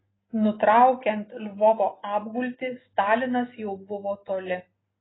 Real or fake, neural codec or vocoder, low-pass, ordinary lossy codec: real; none; 7.2 kHz; AAC, 16 kbps